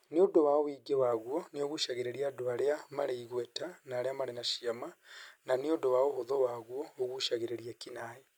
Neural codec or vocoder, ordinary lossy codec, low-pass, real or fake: none; none; none; real